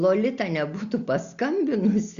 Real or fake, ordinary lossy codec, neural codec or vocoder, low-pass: real; Opus, 64 kbps; none; 7.2 kHz